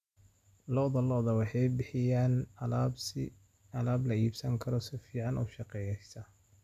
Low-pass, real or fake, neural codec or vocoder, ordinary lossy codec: 14.4 kHz; real; none; none